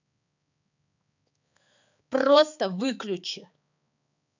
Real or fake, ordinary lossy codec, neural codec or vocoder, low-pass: fake; none; codec, 16 kHz, 4 kbps, X-Codec, HuBERT features, trained on balanced general audio; 7.2 kHz